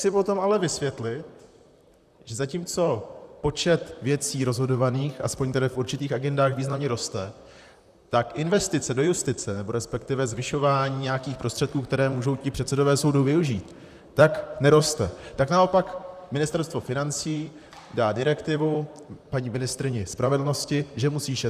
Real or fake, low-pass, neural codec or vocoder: fake; 14.4 kHz; vocoder, 44.1 kHz, 128 mel bands, Pupu-Vocoder